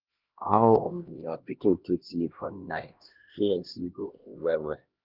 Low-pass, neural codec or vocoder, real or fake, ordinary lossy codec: 5.4 kHz; codec, 16 kHz, 1 kbps, X-Codec, HuBERT features, trained on LibriSpeech; fake; Opus, 32 kbps